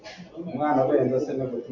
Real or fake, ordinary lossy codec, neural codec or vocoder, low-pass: real; AAC, 48 kbps; none; 7.2 kHz